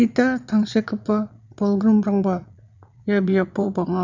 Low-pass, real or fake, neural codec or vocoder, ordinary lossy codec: 7.2 kHz; fake; vocoder, 22.05 kHz, 80 mel bands, Vocos; none